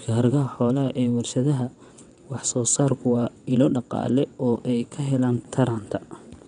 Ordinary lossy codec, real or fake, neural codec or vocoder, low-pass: MP3, 96 kbps; fake; vocoder, 22.05 kHz, 80 mel bands, WaveNeXt; 9.9 kHz